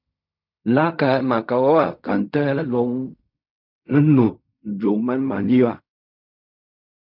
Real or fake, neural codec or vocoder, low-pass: fake; codec, 16 kHz in and 24 kHz out, 0.4 kbps, LongCat-Audio-Codec, fine tuned four codebook decoder; 5.4 kHz